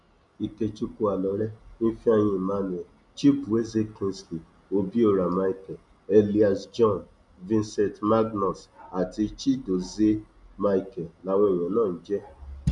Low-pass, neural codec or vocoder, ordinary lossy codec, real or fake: 10.8 kHz; none; none; real